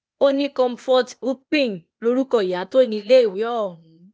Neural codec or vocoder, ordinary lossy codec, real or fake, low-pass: codec, 16 kHz, 0.8 kbps, ZipCodec; none; fake; none